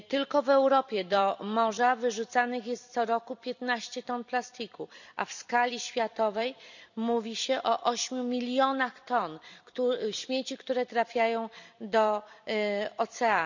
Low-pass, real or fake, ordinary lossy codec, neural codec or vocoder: 7.2 kHz; fake; none; vocoder, 44.1 kHz, 128 mel bands every 256 samples, BigVGAN v2